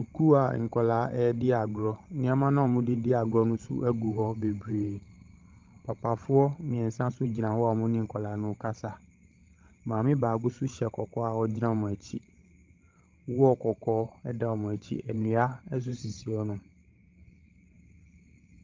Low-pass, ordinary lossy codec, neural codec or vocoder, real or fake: 7.2 kHz; Opus, 32 kbps; codec, 16 kHz, 16 kbps, FreqCodec, larger model; fake